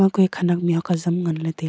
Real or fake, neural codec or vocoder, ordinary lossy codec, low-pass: real; none; none; none